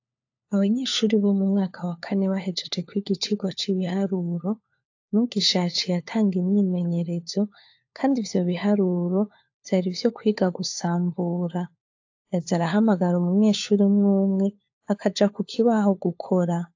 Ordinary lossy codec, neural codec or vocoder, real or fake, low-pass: AAC, 48 kbps; codec, 16 kHz, 4 kbps, FunCodec, trained on LibriTTS, 50 frames a second; fake; 7.2 kHz